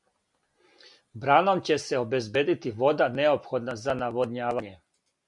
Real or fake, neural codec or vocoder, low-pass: real; none; 10.8 kHz